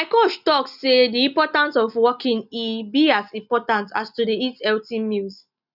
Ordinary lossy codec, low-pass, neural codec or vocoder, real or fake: none; 5.4 kHz; none; real